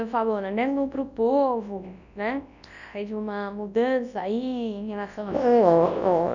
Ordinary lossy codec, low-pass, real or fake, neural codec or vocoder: none; 7.2 kHz; fake; codec, 24 kHz, 0.9 kbps, WavTokenizer, large speech release